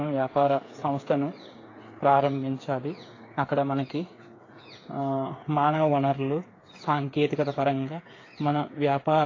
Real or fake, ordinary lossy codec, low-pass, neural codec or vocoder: fake; AAC, 32 kbps; 7.2 kHz; codec, 16 kHz, 8 kbps, FreqCodec, smaller model